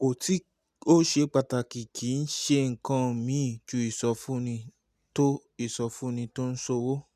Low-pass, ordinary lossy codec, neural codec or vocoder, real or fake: 14.4 kHz; none; none; real